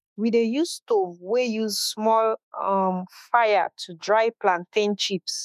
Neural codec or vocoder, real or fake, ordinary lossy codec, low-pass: autoencoder, 48 kHz, 32 numbers a frame, DAC-VAE, trained on Japanese speech; fake; none; 14.4 kHz